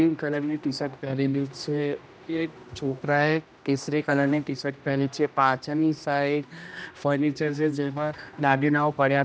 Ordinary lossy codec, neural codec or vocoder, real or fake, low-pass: none; codec, 16 kHz, 1 kbps, X-Codec, HuBERT features, trained on general audio; fake; none